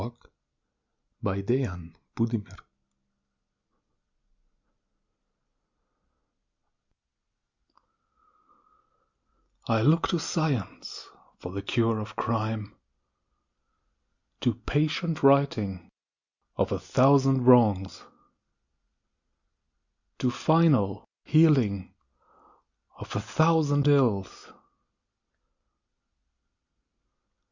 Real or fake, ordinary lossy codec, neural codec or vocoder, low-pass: real; Opus, 64 kbps; none; 7.2 kHz